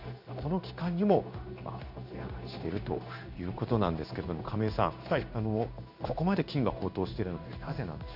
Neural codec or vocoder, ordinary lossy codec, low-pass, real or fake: codec, 16 kHz in and 24 kHz out, 1 kbps, XY-Tokenizer; none; 5.4 kHz; fake